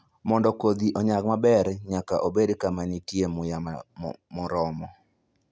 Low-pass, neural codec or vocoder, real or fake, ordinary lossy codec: none; none; real; none